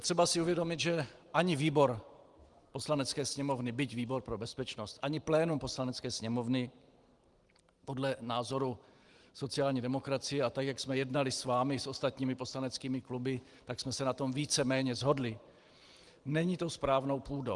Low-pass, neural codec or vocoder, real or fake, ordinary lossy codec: 10.8 kHz; vocoder, 44.1 kHz, 128 mel bands every 512 samples, BigVGAN v2; fake; Opus, 24 kbps